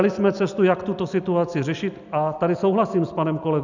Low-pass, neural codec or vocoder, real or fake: 7.2 kHz; none; real